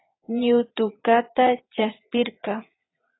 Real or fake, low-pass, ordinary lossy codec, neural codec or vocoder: fake; 7.2 kHz; AAC, 16 kbps; vocoder, 22.05 kHz, 80 mel bands, Vocos